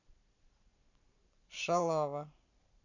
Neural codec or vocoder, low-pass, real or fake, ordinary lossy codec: vocoder, 44.1 kHz, 128 mel bands every 512 samples, BigVGAN v2; 7.2 kHz; fake; none